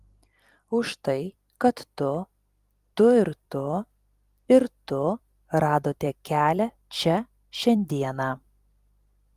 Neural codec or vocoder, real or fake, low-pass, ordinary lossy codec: vocoder, 44.1 kHz, 128 mel bands every 256 samples, BigVGAN v2; fake; 14.4 kHz; Opus, 32 kbps